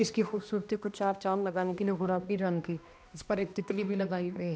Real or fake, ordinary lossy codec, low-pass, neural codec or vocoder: fake; none; none; codec, 16 kHz, 1 kbps, X-Codec, HuBERT features, trained on balanced general audio